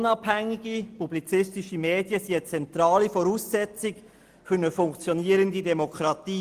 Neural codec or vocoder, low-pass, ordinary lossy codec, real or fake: none; 14.4 kHz; Opus, 24 kbps; real